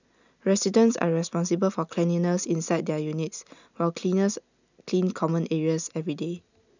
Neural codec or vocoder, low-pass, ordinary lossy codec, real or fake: none; 7.2 kHz; none; real